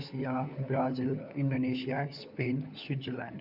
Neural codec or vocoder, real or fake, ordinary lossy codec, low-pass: codec, 16 kHz, 4 kbps, FunCodec, trained on LibriTTS, 50 frames a second; fake; none; 5.4 kHz